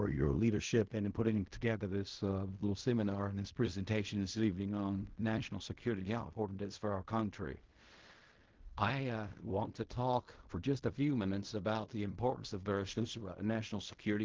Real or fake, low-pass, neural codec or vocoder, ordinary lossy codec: fake; 7.2 kHz; codec, 16 kHz in and 24 kHz out, 0.4 kbps, LongCat-Audio-Codec, fine tuned four codebook decoder; Opus, 16 kbps